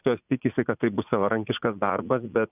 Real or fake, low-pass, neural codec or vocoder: fake; 3.6 kHz; vocoder, 44.1 kHz, 80 mel bands, Vocos